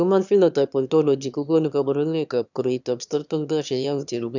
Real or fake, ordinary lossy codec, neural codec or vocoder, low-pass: fake; none; autoencoder, 22.05 kHz, a latent of 192 numbers a frame, VITS, trained on one speaker; 7.2 kHz